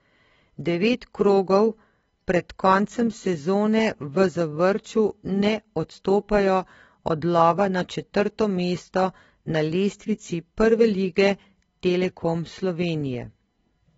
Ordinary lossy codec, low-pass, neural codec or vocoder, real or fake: AAC, 24 kbps; 19.8 kHz; none; real